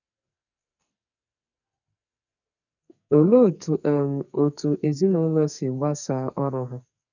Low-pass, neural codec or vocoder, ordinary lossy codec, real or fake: 7.2 kHz; codec, 44.1 kHz, 2.6 kbps, SNAC; none; fake